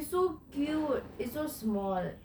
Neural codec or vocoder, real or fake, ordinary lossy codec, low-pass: none; real; none; none